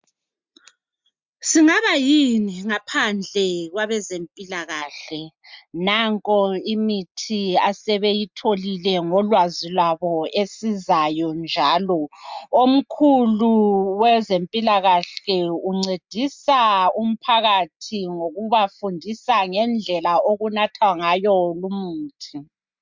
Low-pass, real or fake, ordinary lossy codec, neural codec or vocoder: 7.2 kHz; real; MP3, 64 kbps; none